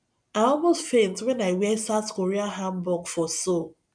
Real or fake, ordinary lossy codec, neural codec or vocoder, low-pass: real; none; none; 9.9 kHz